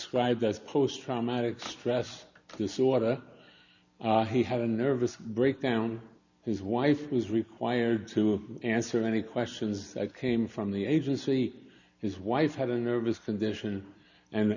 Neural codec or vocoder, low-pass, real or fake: none; 7.2 kHz; real